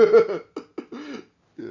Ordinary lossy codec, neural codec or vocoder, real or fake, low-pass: Opus, 64 kbps; none; real; 7.2 kHz